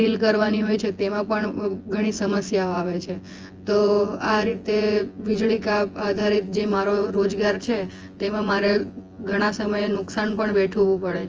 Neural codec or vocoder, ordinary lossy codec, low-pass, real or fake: vocoder, 24 kHz, 100 mel bands, Vocos; Opus, 24 kbps; 7.2 kHz; fake